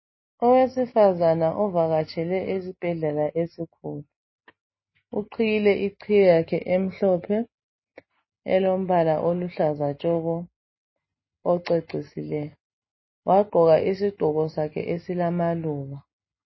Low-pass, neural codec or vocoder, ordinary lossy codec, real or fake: 7.2 kHz; none; MP3, 24 kbps; real